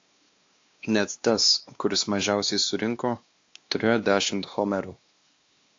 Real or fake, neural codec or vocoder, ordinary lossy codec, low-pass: fake; codec, 16 kHz, 2 kbps, X-Codec, WavLM features, trained on Multilingual LibriSpeech; AAC, 48 kbps; 7.2 kHz